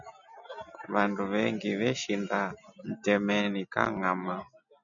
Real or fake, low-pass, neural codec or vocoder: real; 7.2 kHz; none